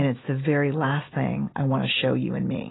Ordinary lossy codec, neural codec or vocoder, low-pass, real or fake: AAC, 16 kbps; none; 7.2 kHz; real